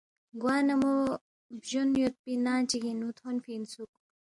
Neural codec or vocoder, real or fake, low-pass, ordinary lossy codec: none; real; 10.8 kHz; MP3, 64 kbps